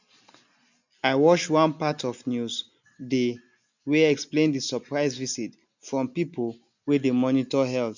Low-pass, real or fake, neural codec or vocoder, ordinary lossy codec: 7.2 kHz; real; none; none